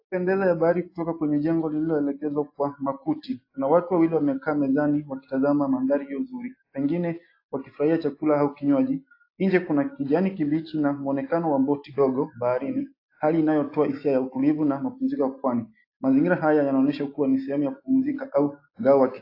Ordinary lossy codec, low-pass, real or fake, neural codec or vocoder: AAC, 32 kbps; 5.4 kHz; real; none